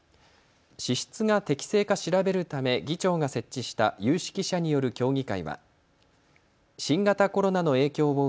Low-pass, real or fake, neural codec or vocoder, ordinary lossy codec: none; real; none; none